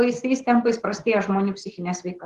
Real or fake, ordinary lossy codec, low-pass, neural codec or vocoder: real; Opus, 16 kbps; 14.4 kHz; none